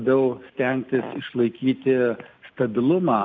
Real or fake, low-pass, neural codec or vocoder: real; 7.2 kHz; none